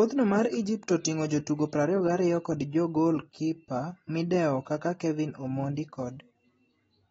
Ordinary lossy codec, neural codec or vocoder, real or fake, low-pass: AAC, 24 kbps; none; real; 14.4 kHz